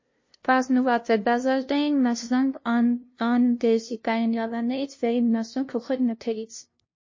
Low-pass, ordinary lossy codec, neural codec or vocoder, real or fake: 7.2 kHz; MP3, 32 kbps; codec, 16 kHz, 0.5 kbps, FunCodec, trained on LibriTTS, 25 frames a second; fake